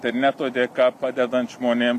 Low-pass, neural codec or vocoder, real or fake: 14.4 kHz; none; real